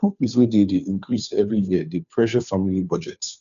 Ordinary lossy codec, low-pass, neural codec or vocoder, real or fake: none; 7.2 kHz; codec, 16 kHz, 2 kbps, FunCodec, trained on Chinese and English, 25 frames a second; fake